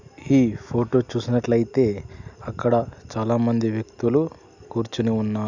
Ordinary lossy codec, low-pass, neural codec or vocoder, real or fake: none; 7.2 kHz; none; real